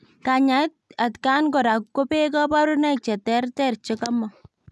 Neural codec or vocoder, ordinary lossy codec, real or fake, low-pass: none; none; real; none